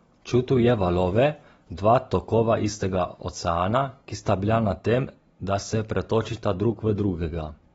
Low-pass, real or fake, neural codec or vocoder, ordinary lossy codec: 19.8 kHz; fake; vocoder, 44.1 kHz, 128 mel bands every 256 samples, BigVGAN v2; AAC, 24 kbps